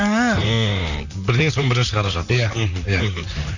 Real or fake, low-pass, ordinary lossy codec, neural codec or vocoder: fake; 7.2 kHz; none; vocoder, 44.1 kHz, 128 mel bands, Pupu-Vocoder